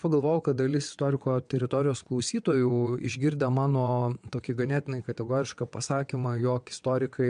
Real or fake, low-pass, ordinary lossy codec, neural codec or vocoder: fake; 9.9 kHz; MP3, 64 kbps; vocoder, 22.05 kHz, 80 mel bands, WaveNeXt